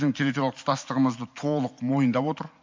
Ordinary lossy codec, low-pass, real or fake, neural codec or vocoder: MP3, 48 kbps; 7.2 kHz; real; none